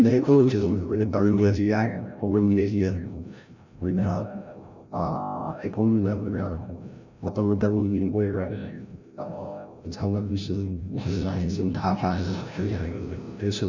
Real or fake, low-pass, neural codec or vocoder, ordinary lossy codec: fake; 7.2 kHz; codec, 16 kHz, 0.5 kbps, FreqCodec, larger model; none